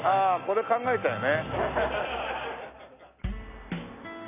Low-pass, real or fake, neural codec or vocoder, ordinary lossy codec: 3.6 kHz; real; none; none